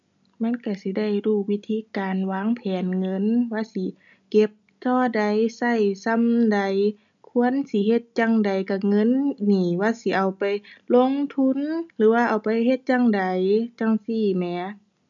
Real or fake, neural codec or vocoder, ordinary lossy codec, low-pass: real; none; none; 7.2 kHz